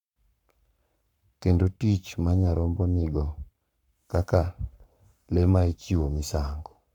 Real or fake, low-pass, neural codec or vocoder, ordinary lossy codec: fake; 19.8 kHz; codec, 44.1 kHz, 7.8 kbps, Pupu-Codec; none